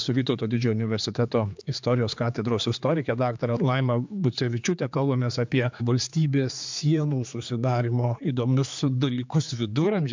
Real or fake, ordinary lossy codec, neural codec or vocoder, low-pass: fake; MP3, 64 kbps; codec, 16 kHz, 4 kbps, X-Codec, HuBERT features, trained on general audio; 7.2 kHz